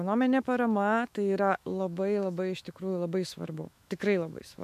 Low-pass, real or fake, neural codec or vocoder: 14.4 kHz; fake; autoencoder, 48 kHz, 128 numbers a frame, DAC-VAE, trained on Japanese speech